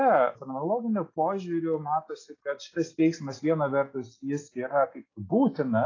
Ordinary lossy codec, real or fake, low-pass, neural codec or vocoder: AAC, 32 kbps; real; 7.2 kHz; none